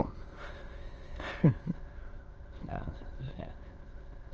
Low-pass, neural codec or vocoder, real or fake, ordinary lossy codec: 7.2 kHz; autoencoder, 22.05 kHz, a latent of 192 numbers a frame, VITS, trained on many speakers; fake; Opus, 24 kbps